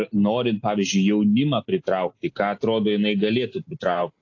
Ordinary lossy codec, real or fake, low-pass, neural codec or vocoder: AAC, 48 kbps; real; 7.2 kHz; none